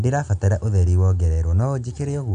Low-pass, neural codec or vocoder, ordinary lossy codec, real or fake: 9.9 kHz; none; none; real